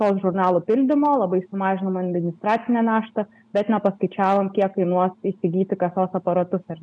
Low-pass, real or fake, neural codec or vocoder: 9.9 kHz; real; none